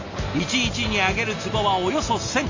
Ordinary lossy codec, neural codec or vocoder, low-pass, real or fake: AAC, 48 kbps; none; 7.2 kHz; real